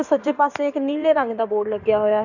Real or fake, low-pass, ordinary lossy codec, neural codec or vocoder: fake; 7.2 kHz; none; codec, 16 kHz in and 24 kHz out, 2.2 kbps, FireRedTTS-2 codec